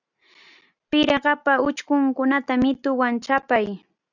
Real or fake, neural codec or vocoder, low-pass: real; none; 7.2 kHz